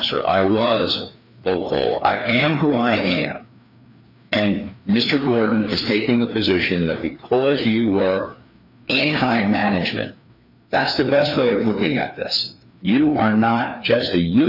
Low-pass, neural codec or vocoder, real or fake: 5.4 kHz; codec, 16 kHz, 2 kbps, FreqCodec, larger model; fake